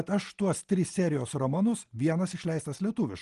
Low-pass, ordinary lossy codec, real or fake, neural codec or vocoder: 10.8 kHz; Opus, 24 kbps; real; none